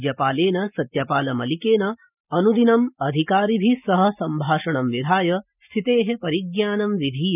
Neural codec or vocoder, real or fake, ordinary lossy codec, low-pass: none; real; none; 3.6 kHz